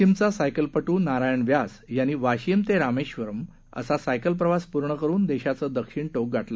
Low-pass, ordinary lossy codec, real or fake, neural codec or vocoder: none; none; real; none